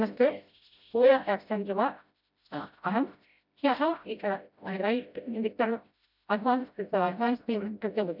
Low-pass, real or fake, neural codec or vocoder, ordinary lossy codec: 5.4 kHz; fake; codec, 16 kHz, 0.5 kbps, FreqCodec, smaller model; none